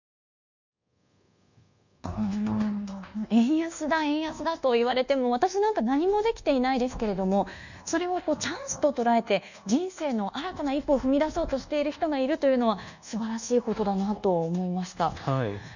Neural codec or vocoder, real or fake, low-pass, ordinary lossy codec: codec, 24 kHz, 1.2 kbps, DualCodec; fake; 7.2 kHz; none